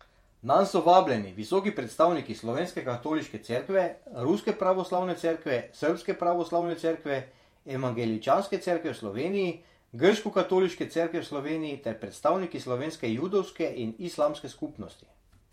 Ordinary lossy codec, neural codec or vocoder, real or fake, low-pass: MP3, 64 kbps; vocoder, 48 kHz, 128 mel bands, Vocos; fake; 19.8 kHz